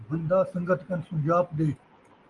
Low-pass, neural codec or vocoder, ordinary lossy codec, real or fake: 10.8 kHz; none; Opus, 32 kbps; real